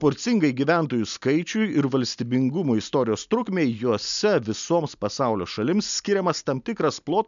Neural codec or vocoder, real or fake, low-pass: none; real; 7.2 kHz